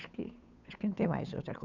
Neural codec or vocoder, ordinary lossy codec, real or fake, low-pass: codec, 16 kHz, 8 kbps, FunCodec, trained on Chinese and English, 25 frames a second; none; fake; 7.2 kHz